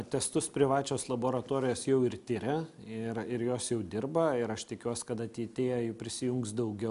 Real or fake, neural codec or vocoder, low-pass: real; none; 10.8 kHz